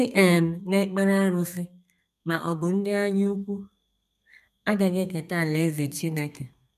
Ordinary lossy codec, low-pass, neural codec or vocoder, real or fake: none; 14.4 kHz; codec, 32 kHz, 1.9 kbps, SNAC; fake